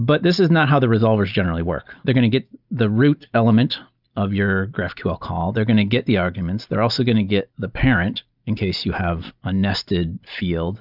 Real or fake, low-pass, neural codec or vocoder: real; 5.4 kHz; none